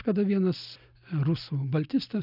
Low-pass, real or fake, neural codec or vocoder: 5.4 kHz; real; none